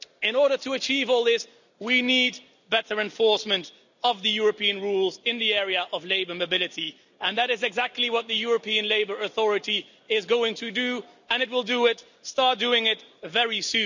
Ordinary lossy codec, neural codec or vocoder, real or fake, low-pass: none; none; real; 7.2 kHz